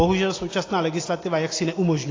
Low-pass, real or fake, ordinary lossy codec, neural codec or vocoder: 7.2 kHz; real; AAC, 32 kbps; none